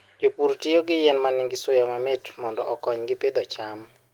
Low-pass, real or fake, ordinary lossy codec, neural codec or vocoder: 19.8 kHz; fake; Opus, 24 kbps; autoencoder, 48 kHz, 128 numbers a frame, DAC-VAE, trained on Japanese speech